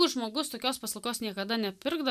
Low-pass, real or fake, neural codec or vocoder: 14.4 kHz; real; none